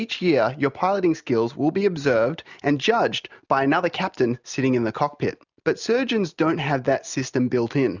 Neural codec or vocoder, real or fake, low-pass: none; real; 7.2 kHz